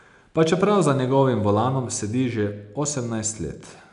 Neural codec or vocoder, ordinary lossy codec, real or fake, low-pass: none; none; real; 10.8 kHz